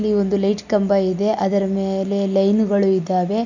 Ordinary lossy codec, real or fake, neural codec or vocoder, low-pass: none; real; none; 7.2 kHz